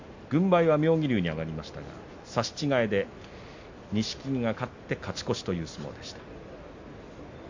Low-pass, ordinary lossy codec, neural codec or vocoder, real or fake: 7.2 kHz; MP3, 48 kbps; none; real